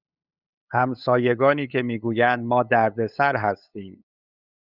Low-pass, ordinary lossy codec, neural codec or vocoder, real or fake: 5.4 kHz; Opus, 64 kbps; codec, 16 kHz, 8 kbps, FunCodec, trained on LibriTTS, 25 frames a second; fake